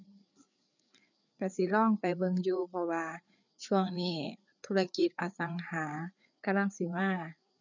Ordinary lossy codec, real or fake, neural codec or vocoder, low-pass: none; fake; codec, 16 kHz, 4 kbps, FreqCodec, larger model; 7.2 kHz